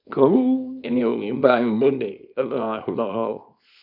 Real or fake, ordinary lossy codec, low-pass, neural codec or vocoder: fake; none; 5.4 kHz; codec, 24 kHz, 0.9 kbps, WavTokenizer, small release